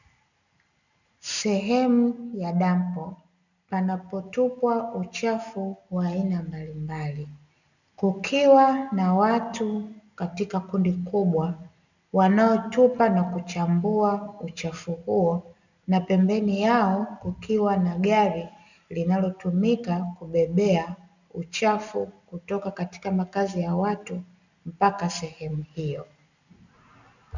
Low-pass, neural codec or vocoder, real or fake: 7.2 kHz; none; real